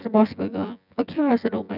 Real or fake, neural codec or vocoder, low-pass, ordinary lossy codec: fake; vocoder, 24 kHz, 100 mel bands, Vocos; 5.4 kHz; none